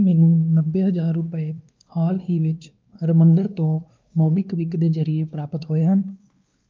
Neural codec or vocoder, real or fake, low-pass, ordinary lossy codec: codec, 16 kHz, 2 kbps, X-Codec, WavLM features, trained on Multilingual LibriSpeech; fake; 7.2 kHz; Opus, 24 kbps